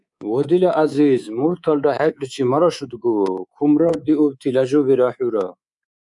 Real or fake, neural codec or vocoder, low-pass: fake; codec, 24 kHz, 3.1 kbps, DualCodec; 10.8 kHz